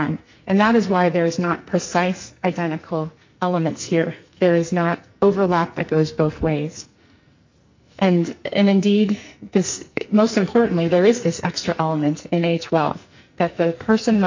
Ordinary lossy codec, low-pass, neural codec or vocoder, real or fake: MP3, 64 kbps; 7.2 kHz; codec, 44.1 kHz, 2.6 kbps, SNAC; fake